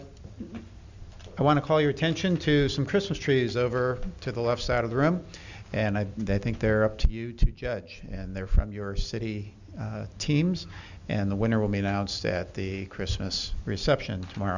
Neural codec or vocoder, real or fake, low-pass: none; real; 7.2 kHz